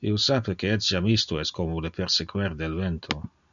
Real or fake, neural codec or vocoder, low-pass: real; none; 7.2 kHz